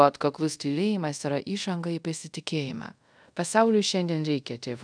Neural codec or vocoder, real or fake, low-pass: codec, 24 kHz, 0.5 kbps, DualCodec; fake; 9.9 kHz